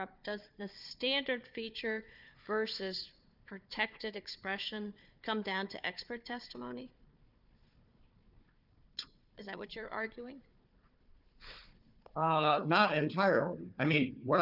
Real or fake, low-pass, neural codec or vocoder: fake; 5.4 kHz; codec, 16 kHz, 4 kbps, FunCodec, trained on Chinese and English, 50 frames a second